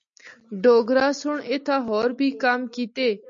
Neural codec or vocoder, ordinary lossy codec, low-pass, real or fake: none; MP3, 48 kbps; 7.2 kHz; real